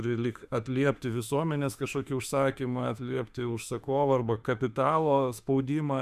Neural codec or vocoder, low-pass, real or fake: autoencoder, 48 kHz, 32 numbers a frame, DAC-VAE, trained on Japanese speech; 14.4 kHz; fake